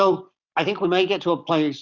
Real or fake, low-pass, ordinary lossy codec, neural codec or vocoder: real; 7.2 kHz; Opus, 64 kbps; none